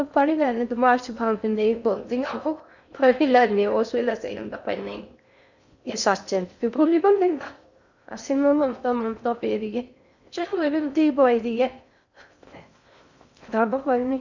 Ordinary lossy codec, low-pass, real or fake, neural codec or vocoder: none; 7.2 kHz; fake; codec, 16 kHz in and 24 kHz out, 0.6 kbps, FocalCodec, streaming, 2048 codes